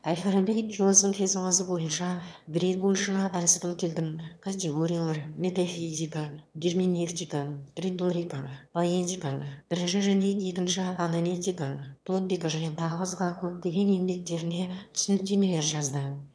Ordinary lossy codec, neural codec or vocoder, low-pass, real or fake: none; autoencoder, 22.05 kHz, a latent of 192 numbers a frame, VITS, trained on one speaker; none; fake